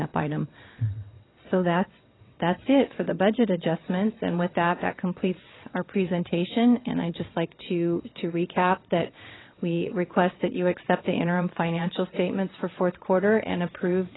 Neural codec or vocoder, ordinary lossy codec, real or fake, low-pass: vocoder, 22.05 kHz, 80 mel bands, WaveNeXt; AAC, 16 kbps; fake; 7.2 kHz